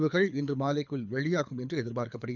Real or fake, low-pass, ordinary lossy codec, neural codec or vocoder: fake; 7.2 kHz; none; codec, 24 kHz, 6 kbps, HILCodec